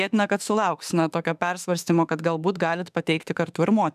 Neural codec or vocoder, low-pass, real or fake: autoencoder, 48 kHz, 32 numbers a frame, DAC-VAE, trained on Japanese speech; 14.4 kHz; fake